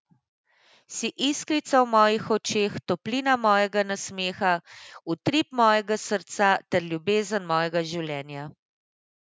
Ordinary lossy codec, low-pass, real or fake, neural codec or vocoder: none; none; real; none